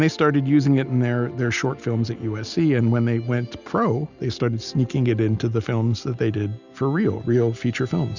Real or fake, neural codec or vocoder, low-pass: real; none; 7.2 kHz